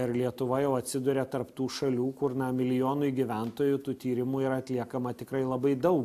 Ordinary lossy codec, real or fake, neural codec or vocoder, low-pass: AAC, 96 kbps; real; none; 14.4 kHz